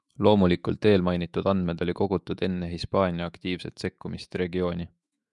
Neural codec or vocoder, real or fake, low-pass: autoencoder, 48 kHz, 128 numbers a frame, DAC-VAE, trained on Japanese speech; fake; 10.8 kHz